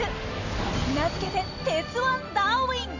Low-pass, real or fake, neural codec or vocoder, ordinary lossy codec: 7.2 kHz; real; none; AAC, 48 kbps